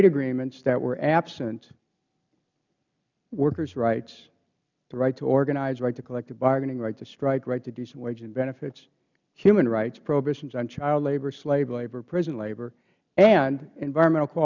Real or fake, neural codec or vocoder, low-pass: real; none; 7.2 kHz